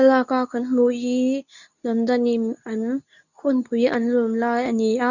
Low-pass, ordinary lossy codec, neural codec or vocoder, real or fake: 7.2 kHz; none; codec, 24 kHz, 0.9 kbps, WavTokenizer, medium speech release version 2; fake